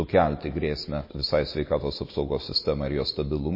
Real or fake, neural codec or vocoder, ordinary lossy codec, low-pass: real; none; MP3, 24 kbps; 5.4 kHz